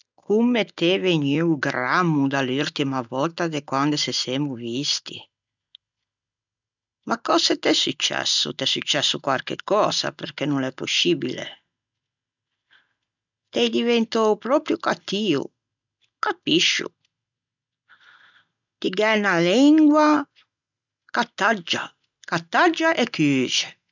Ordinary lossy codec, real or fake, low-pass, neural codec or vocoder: none; real; 7.2 kHz; none